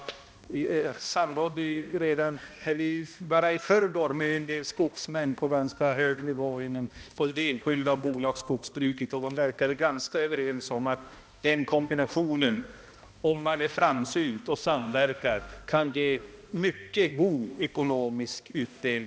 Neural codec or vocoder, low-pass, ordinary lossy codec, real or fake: codec, 16 kHz, 1 kbps, X-Codec, HuBERT features, trained on balanced general audio; none; none; fake